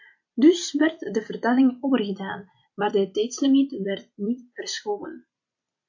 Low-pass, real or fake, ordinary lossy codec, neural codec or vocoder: 7.2 kHz; fake; AAC, 48 kbps; vocoder, 44.1 kHz, 128 mel bands every 256 samples, BigVGAN v2